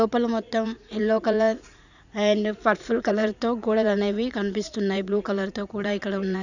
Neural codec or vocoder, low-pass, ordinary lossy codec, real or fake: vocoder, 44.1 kHz, 80 mel bands, Vocos; 7.2 kHz; none; fake